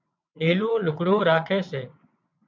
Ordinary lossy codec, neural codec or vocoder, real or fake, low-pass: MP3, 64 kbps; vocoder, 22.05 kHz, 80 mel bands, WaveNeXt; fake; 7.2 kHz